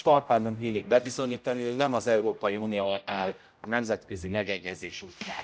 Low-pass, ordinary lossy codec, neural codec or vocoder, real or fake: none; none; codec, 16 kHz, 0.5 kbps, X-Codec, HuBERT features, trained on general audio; fake